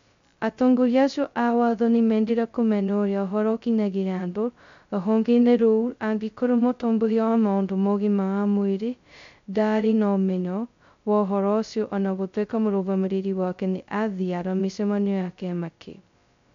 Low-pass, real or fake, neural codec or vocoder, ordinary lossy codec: 7.2 kHz; fake; codec, 16 kHz, 0.2 kbps, FocalCodec; MP3, 64 kbps